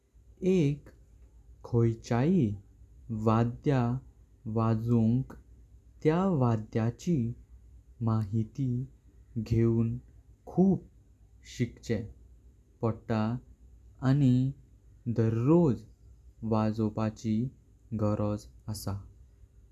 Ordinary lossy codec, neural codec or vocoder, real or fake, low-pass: none; none; real; 14.4 kHz